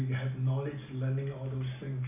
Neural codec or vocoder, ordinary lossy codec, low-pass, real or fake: none; none; 3.6 kHz; real